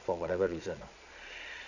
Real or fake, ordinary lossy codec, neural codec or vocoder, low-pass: fake; none; vocoder, 22.05 kHz, 80 mel bands, Vocos; 7.2 kHz